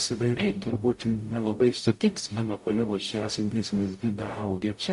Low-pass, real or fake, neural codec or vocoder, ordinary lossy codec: 14.4 kHz; fake; codec, 44.1 kHz, 0.9 kbps, DAC; MP3, 48 kbps